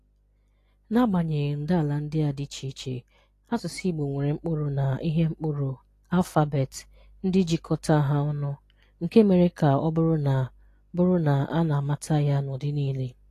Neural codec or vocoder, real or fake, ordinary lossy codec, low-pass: none; real; AAC, 48 kbps; 14.4 kHz